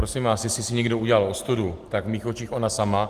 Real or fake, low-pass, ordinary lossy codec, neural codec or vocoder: real; 14.4 kHz; Opus, 24 kbps; none